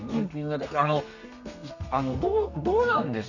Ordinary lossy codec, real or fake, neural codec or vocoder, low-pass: none; fake; codec, 32 kHz, 1.9 kbps, SNAC; 7.2 kHz